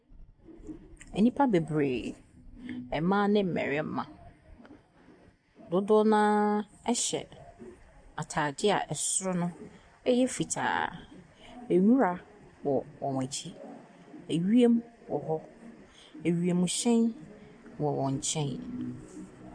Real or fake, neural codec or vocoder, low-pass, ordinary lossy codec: real; none; 9.9 kHz; AAC, 64 kbps